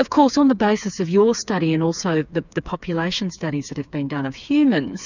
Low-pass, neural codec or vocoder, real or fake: 7.2 kHz; codec, 16 kHz, 4 kbps, FreqCodec, smaller model; fake